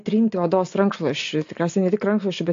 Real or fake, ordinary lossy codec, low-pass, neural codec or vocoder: real; AAC, 48 kbps; 7.2 kHz; none